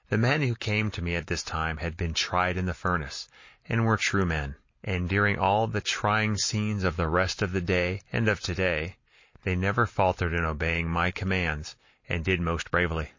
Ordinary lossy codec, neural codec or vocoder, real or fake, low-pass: MP3, 32 kbps; none; real; 7.2 kHz